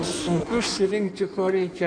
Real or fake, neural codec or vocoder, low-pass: fake; codec, 16 kHz in and 24 kHz out, 1.1 kbps, FireRedTTS-2 codec; 9.9 kHz